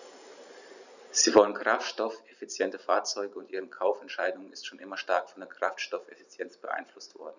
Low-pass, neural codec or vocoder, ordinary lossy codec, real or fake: 7.2 kHz; vocoder, 44.1 kHz, 128 mel bands every 512 samples, BigVGAN v2; none; fake